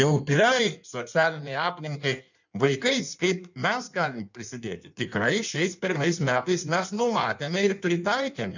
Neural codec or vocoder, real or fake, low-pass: codec, 16 kHz in and 24 kHz out, 1.1 kbps, FireRedTTS-2 codec; fake; 7.2 kHz